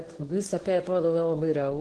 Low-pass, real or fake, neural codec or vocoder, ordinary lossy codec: 10.8 kHz; fake; codec, 24 kHz, 0.9 kbps, WavTokenizer, medium speech release version 1; Opus, 16 kbps